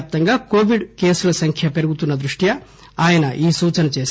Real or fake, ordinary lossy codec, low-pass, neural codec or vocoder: real; none; none; none